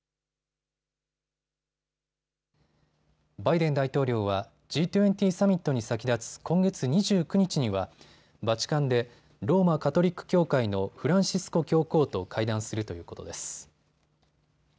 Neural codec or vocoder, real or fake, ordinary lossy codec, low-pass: none; real; none; none